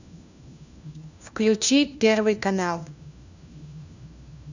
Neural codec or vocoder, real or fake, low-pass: codec, 16 kHz, 1 kbps, FunCodec, trained on LibriTTS, 50 frames a second; fake; 7.2 kHz